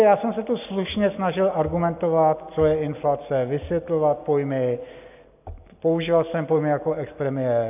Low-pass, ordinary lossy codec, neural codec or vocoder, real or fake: 3.6 kHz; AAC, 32 kbps; none; real